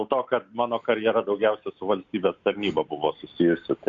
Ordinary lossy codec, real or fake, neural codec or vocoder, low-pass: MP3, 64 kbps; real; none; 7.2 kHz